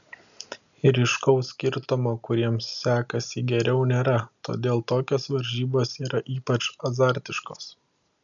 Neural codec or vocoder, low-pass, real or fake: none; 7.2 kHz; real